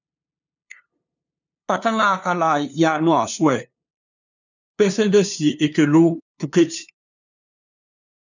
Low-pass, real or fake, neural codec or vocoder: 7.2 kHz; fake; codec, 16 kHz, 2 kbps, FunCodec, trained on LibriTTS, 25 frames a second